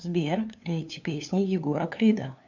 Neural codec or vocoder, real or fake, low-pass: codec, 16 kHz, 2 kbps, FunCodec, trained on LibriTTS, 25 frames a second; fake; 7.2 kHz